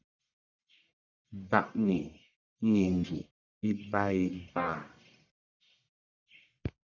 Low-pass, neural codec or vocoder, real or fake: 7.2 kHz; codec, 44.1 kHz, 1.7 kbps, Pupu-Codec; fake